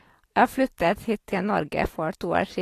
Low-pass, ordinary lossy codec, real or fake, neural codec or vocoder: 14.4 kHz; AAC, 48 kbps; real; none